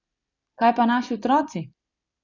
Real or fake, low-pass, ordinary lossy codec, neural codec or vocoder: fake; 7.2 kHz; none; vocoder, 44.1 kHz, 128 mel bands every 256 samples, BigVGAN v2